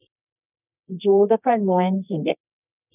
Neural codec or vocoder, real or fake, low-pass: codec, 24 kHz, 0.9 kbps, WavTokenizer, medium music audio release; fake; 3.6 kHz